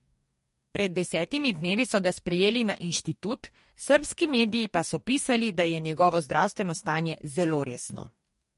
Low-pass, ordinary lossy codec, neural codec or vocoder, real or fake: 14.4 kHz; MP3, 48 kbps; codec, 44.1 kHz, 2.6 kbps, DAC; fake